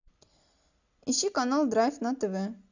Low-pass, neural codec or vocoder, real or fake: 7.2 kHz; none; real